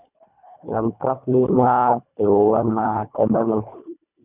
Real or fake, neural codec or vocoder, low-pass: fake; codec, 24 kHz, 1.5 kbps, HILCodec; 3.6 kHz